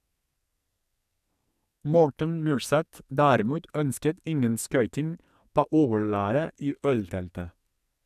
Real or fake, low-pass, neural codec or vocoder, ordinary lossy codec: fake; 14.4 kHz; codec, 44.1 kHz, 2.6 kbps, SNAC; none